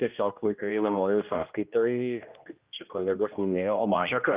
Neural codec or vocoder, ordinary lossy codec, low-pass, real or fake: codec, 16 kHz, 1 kbps, X-Codec, HuBERT features, trained on general audio; Opus, 24 kbps; 3.6 kHz; fake